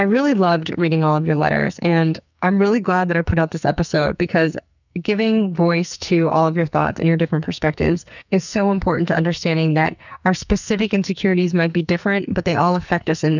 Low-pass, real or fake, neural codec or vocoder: 7.2 kHz; fake; codec, 44.1 kHz, 2.6 kbps, SNAC